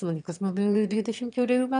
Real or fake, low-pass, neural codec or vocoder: fake; 9.9 kHz; autoencoder, 22.05 kHz, a latent of 192 numbers a frame, VITS, trained on one speaker